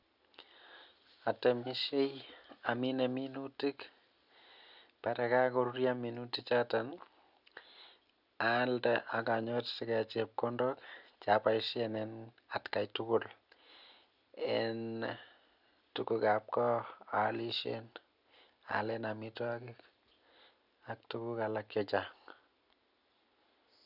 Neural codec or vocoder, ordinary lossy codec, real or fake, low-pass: none; none; real; 5.4 kHz